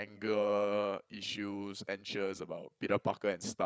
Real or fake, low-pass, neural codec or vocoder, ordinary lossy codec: fake; none; codec, 16 kHz, 8 kbps, FreqCodec, larger model; none